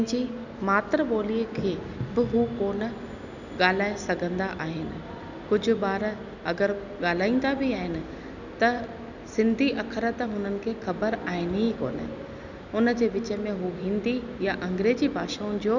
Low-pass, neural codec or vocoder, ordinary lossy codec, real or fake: 7.2 kHz; none; none; real